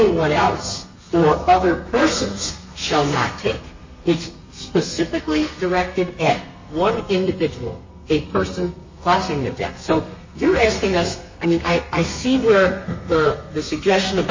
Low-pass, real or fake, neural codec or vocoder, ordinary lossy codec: 7.2 kHz; fake; codec, 32 kHz, 1.9 kbps, SNAC; MP3, 32 kbps